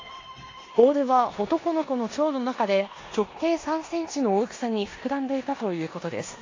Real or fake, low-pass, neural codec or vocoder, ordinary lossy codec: fake; 7.2 kHz; codec, 16 kHz in and 24 kHz out, 0.9 kbps, LongCat-Audio-Codec, four codebook decoder; AAC, 32 kbps